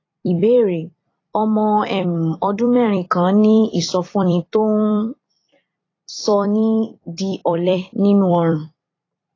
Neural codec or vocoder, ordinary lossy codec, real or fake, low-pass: vocoder, 44.1 kHz, 128 mel bands every 256 samples, BigVGAN v2; AAC, 32 kbps; fake; 7.2 kHz